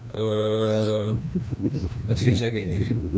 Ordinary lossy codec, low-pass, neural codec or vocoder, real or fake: none; none; codec, 16 kHz, 1 kbps, FreqCodec, larger model; fake